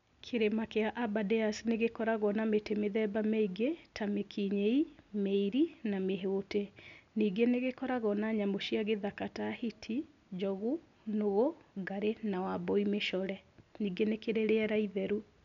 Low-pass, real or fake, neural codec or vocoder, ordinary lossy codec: 7.2 kHz; real; none; none